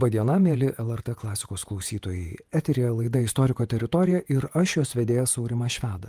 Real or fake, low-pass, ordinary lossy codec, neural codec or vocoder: fake; 14.4 kHz; Opus, 32 kbps; vocoder, 48 kHz, 128 mel bands, Vocos